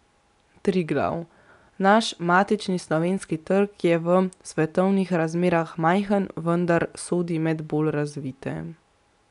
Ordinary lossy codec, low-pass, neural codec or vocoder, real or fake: none; 10.8 kHz; none; real